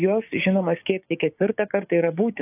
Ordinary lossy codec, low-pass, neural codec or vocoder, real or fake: AAC, 32 kbps; 3.6 kHz; codec, 16 kHz, 6 kbps, DAC; fake